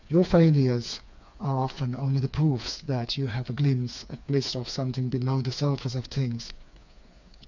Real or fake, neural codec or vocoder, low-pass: fake; codec, 16 kHz, 4 kbps, FreqCodec, smaller model; 7.2 kHz